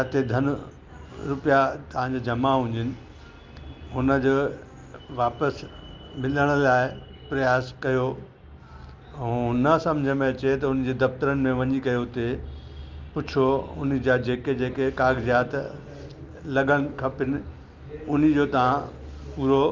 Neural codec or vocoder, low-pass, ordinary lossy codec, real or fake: none; 7.2 kHz; Opus, 24 kbps; real